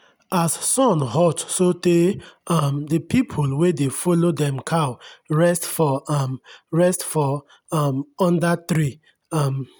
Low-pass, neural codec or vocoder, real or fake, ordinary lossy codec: none; none; real; none